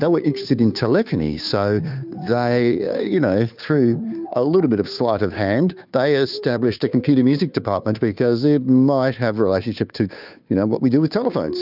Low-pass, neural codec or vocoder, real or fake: 5.4 kHz; codec, 16 kHz, 2 kbps, FunCodec, trained on Chinese and English, 25 frames a second; fake